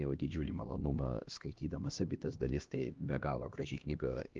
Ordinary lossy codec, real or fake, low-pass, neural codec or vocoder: Opus, 32 kbps; fake; 7.2 kHz; codec, 16 kHz, 1 kbps, X-Codec, HuBERT features, trained on LibriSpeech